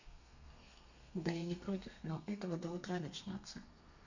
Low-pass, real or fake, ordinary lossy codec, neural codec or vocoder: 7.2 kHz; fake; none; codec, 32 kHz, 1.9 kbps, SNAC